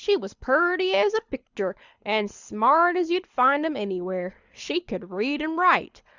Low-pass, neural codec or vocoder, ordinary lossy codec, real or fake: 7.2 kHz; codec, 24 kHz, 6 kbps, HILCodec; Opus, 64 kbps; fake